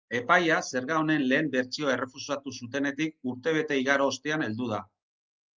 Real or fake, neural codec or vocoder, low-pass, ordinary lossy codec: real; none; 7.2 kHz; Opus, 24 kbps